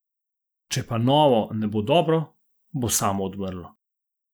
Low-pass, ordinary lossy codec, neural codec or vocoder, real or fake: none; none; none; real